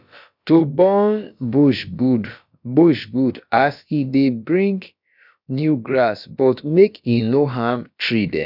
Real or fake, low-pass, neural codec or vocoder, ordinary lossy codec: fake; 5.4 kHz; codec, 16 kHz, about 1 kbps, DyCAST, with the encoder's durations; none